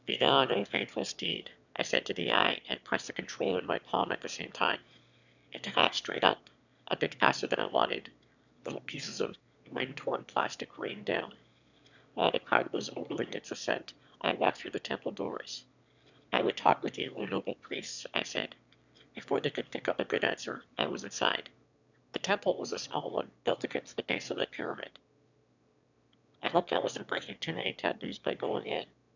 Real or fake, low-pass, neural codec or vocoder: fake; 7.2 kHz; autoencoder, 22.05 kHz, a latent of 192 numbers a frame, VITS, trained on one speaker